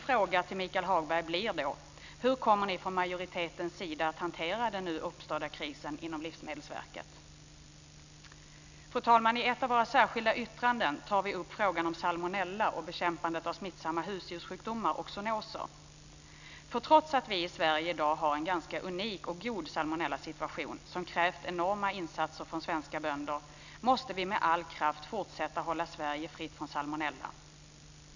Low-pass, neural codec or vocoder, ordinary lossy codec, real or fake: 7.2 kHz; none; none; real